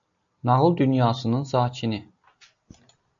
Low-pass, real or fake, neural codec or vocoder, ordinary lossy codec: 7.2 kHz; real; none; AAC, 64 kbps